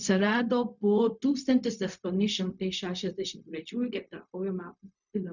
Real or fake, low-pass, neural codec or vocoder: fake; 7.2 kHz; codec, 16 kHz, 0.4 kbps, LongCat-Audio-Codec